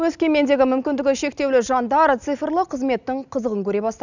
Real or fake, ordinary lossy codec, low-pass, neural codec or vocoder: real; none; 7.2 kHz; none